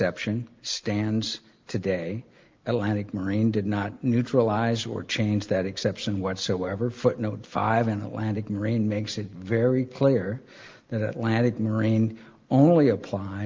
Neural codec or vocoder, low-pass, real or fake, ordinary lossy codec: none; 7.2 kHz; real; Opus, 32 kbps